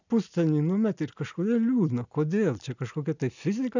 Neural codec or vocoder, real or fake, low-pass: none; real; 7.2 kHz